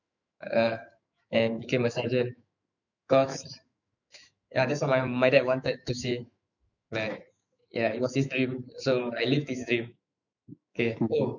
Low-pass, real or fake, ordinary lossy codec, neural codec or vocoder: 7.2 kHz; fake; none; autoencoder, 48 kHz, 128 numbers a frame, DAC-VAE, trained on Japanese speech